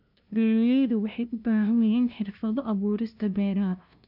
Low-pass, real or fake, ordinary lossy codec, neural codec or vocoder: 5.4 kHz; fake; none; codec, 16 kHz, 0.5 kbps, FunCodec, trained on LibriTTS, 25 frames a second